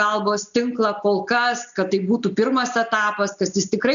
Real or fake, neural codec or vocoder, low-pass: real; none; 7.2 kHz